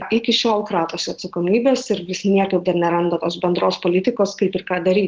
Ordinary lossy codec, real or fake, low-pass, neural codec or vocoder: Opus, 16 kbps; real; 7.2 kHz; none